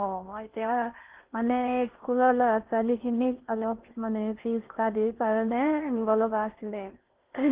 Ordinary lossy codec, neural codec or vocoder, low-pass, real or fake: Opus, 16 kbps; codec, 16 kHz in and 24 kHz out, 0.6 kbps, FocalCodec, streaming, 4096 codes; 3.6 kHz; fake